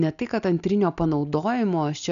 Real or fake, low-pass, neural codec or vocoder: real; 7.2 kHz; none